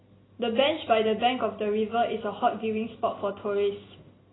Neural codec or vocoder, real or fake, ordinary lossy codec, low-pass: none; real; AAC, 16 kbps; 7.2 kHz